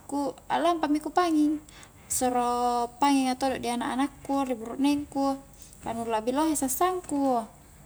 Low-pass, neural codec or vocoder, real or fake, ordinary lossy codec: none; none; real; none